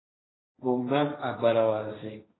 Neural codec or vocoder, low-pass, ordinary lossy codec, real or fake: codec, 16 kHz, 1.1 kbps, Voila-Tokenizer; 7.2 kHz; AAC, 16 kbps; fake